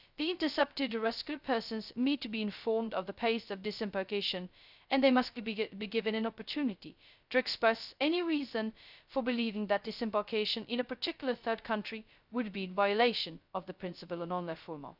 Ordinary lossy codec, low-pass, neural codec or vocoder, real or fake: none; 5.4 kHz; codec, 16 kHz, 0.2 kbps, FocalCodec; fake